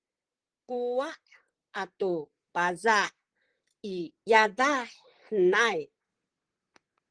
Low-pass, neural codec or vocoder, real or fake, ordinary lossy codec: 9.9 kHz; vocoder, 44.1 kHz, 128 mel bands, Pupu-Vocoder; fake; Opus, 16 kbps